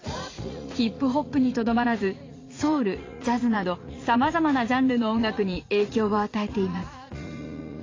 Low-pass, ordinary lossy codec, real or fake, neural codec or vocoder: 7.2 kHz; AAC, 32 kbps; fake; vocoder, 44.1 kHz, 80 mel bands, Vocos